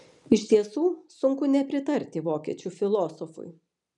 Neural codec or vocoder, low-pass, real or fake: none; 10.8 kHz; real